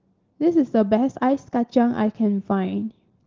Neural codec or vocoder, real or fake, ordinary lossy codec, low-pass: none; real; Opus, 16 kbps; 7.2 kHz